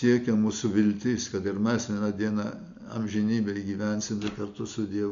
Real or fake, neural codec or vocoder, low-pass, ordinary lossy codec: real; none; 7.2 kHz; Opus, 64 kbps